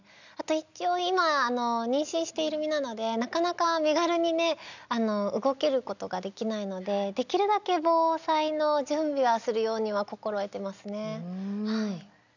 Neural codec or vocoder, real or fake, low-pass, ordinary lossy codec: none; real; 7.2 kHz; none